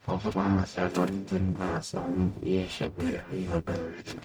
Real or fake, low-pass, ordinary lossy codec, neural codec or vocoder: fake; none; none; codec, 44.1 kHz, 0.9 kbps, DAC